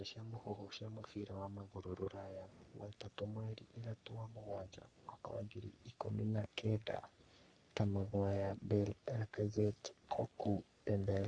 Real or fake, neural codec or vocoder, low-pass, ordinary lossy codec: fake; codec, 44.1 kHz, 3.4 kbps, Pupu-Codec; 9.9 kHz; Opus, 64 kbps